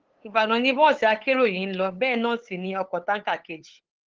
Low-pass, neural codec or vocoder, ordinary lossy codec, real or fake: 7.2 kHz; codec, 16 kHz, 8 kbps, FunCodec, trained on LibriTTS, 25 frames a second; Opus, 16 kbps; fake